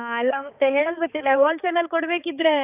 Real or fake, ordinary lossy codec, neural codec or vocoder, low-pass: fake; none; codec, 16 kHz, 4 kbps, X-Codec, HuBERT features, trained on balanced general audio; 3.6 kHz